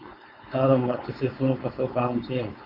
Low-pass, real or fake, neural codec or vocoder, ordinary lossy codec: 5.4 kHz; fake; codec, 16 kHz, 4.8 kbps, FACodec; Opus, 64 kbps